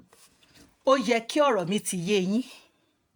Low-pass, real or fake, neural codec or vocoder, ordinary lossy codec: none; fake; vocoder, 48 kHz, 128 mel bands, Vocos; none